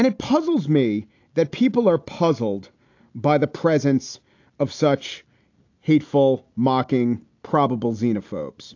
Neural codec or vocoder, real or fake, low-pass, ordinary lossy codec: none; real; 7.2 kHz; AAC, 48 kbps